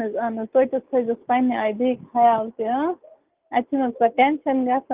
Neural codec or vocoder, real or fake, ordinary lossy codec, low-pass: none; real; Opus, 32 kbps; 3.6 kHz